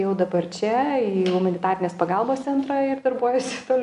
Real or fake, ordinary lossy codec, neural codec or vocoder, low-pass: real; AAC, 96 kbps; none; 10.8 kHz